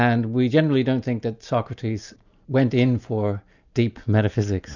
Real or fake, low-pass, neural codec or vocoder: real; 7.2 kHz; none